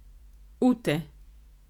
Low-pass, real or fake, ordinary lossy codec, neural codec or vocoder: 19.8 kHz; fake; none; vocoder, 48 kHz, 128 mel bands, Vocos